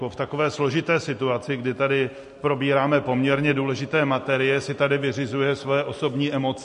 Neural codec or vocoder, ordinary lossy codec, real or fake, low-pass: none; MP3, 48 kbps; real; 10.8 kHz